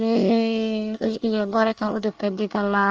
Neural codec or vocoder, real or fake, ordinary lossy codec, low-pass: codec, 24 kHz, 1 kbps, SNAC; fake; Opus, 24 kbps; 7.2 kHz